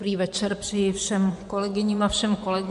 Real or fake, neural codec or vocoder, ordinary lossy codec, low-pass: fake; vocoder, 24 kHz, 100 mel bands, Vocos; MP3, 64 kbps; 10.8 kHz